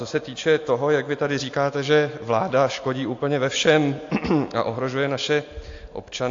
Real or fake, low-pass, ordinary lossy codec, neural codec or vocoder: real; 7.2 kHz; AAC, 48 kbps; none